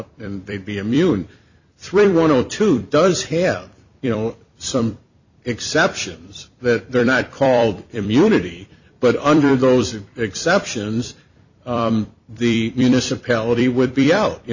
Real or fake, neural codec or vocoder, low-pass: fake; vocoder, 44.1 kHz, 128 mel bands every 256 samples, BigVGAN v2; 7.2 kHz